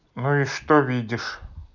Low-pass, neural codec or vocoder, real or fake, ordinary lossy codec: 7.2 kHz; none; real; none